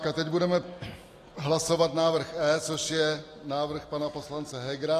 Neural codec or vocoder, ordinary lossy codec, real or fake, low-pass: none; AAC, 48 kbps; real; 14.4 kHz